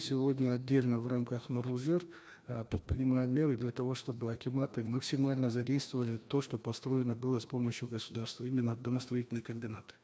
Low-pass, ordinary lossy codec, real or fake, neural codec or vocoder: none; none; fake; codec, 16 kHz, 1 kbps, FreqCodec, larger model